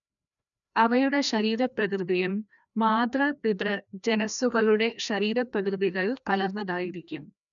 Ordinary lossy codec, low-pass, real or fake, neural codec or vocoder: none; 7.2 kHz; fake; codec, 16 kHz, 1 kbps, FreqCodec, larger model